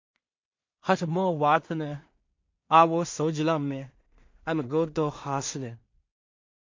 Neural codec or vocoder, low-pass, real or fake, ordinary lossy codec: codec, 16 kHz in and 24 kHz out, 0.4 kbps, LongCat-Audio-Codec, two codebook decoder; 7.2 kHz; fake; MP3, 48 kbps